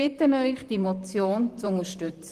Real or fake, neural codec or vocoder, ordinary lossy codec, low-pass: fake; vocoder, 44.1 kHz, 128 mel bands, Pupu-Vocoder; Opus, 24 kbps; 14.4 kHz